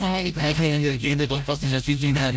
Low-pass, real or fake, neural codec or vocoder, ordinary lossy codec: none; fake; codec, 16 kHz, 0.5 kbps, FreqCodec, larger model; none